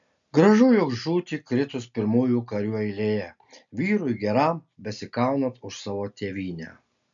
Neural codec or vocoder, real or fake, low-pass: none; real; 7.2 kHz